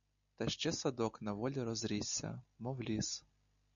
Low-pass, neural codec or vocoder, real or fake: 7.2 kHz; none; real